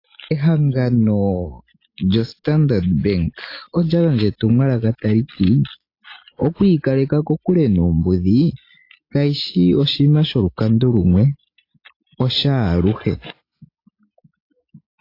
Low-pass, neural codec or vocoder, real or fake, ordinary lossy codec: 5.4 kHz; none; real; AAC, 32 kbps